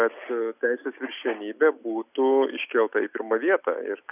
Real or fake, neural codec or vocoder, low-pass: real; none; 3.6 kHz